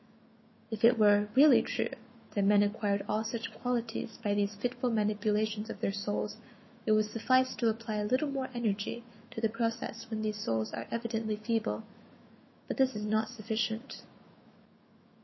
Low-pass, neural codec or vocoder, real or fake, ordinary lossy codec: 7.2 kHz; autoencoder, 48 kHz, 128 numbers a frame, DAC-VAE, trained on Japanese speech; fake; MP3, 24 kbps